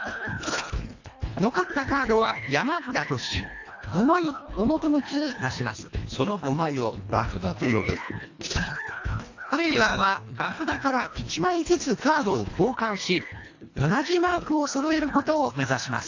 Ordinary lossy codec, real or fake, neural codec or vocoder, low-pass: AAC, 48 kbps; fake; codec, 24 kHz, 1.5 kbps, HILCodec; 7.2 kHz